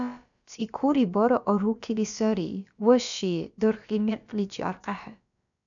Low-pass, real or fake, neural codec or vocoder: 7.2 kHz; fake; codec, 16 kHz, about 1 kbps, DyCAST, with the encoder's durations